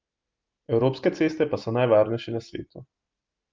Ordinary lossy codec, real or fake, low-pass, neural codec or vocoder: Opus, 32 kbps; real; 7.2 kHz; none